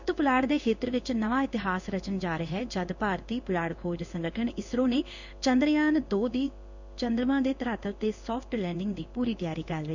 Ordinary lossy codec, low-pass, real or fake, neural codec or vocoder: none; 7.2 kHz; fake; codec, 16 kHz in and 24 kHz out, 1 kbps, XY-Tokenizer